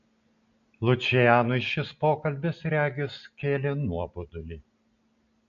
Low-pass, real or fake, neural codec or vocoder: 7.2 kHz; real; none